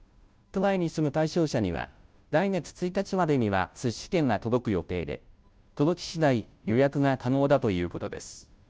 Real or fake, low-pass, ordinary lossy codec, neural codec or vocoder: fake; none; none; codec, 16 kHz, 0.5 kbps, FunCodec, trained on Chinese and English, 25 frames a second